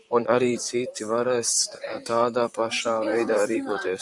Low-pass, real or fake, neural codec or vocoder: 10.8 kHz; fake; vocoder, 44.1 kHz, 128 mel bands, Pupu-Vocoder